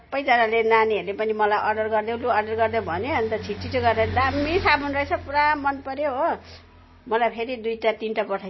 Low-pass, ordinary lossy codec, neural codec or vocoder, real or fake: 7.2 kHz; MP3, 24 kbps; none; real